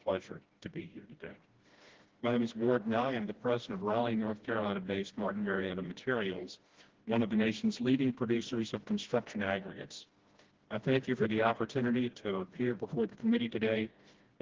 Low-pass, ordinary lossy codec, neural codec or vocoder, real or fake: 7.2 kHz; Opus, 16 kbps; codec, 16 kHz, 1 kbps, FreqCodec, smaller model; fake